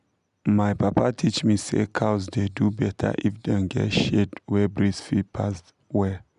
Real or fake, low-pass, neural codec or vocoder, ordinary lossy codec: real; 10.8 kHz; none; MP3, 96 kbps